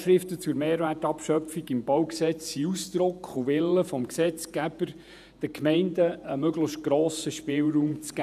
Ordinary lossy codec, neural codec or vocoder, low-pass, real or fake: none; vocoder, 48 kHz, 128 mel bands, Vocos; 14.4 kHz; fake